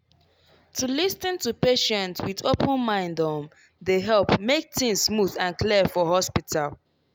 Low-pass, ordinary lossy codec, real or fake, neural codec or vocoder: none; none; real; none